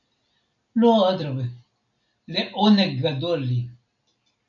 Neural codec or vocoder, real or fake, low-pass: none; real; 7.2 kHz